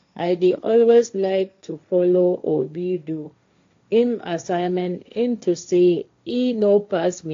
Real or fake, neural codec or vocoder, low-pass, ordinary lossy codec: fake; codec, 16 kHz, 1.1 kbps, Voila-Tokenizer; 7.2 kHz; AAC, 48 kbps